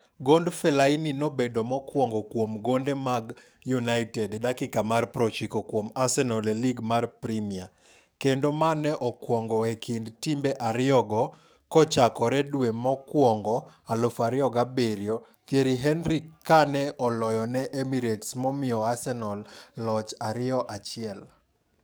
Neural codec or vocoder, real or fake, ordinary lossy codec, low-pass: codec, 44.1 kHz, 7.8 kbps, DAC; fake; none; none